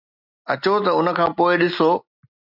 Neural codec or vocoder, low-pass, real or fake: none; 5.4 kHz; real